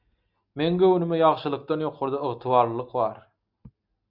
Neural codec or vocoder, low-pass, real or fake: none; 5.4 kHz; real